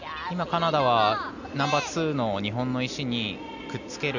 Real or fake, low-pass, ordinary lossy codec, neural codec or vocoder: real; 7.2 kHz; none; none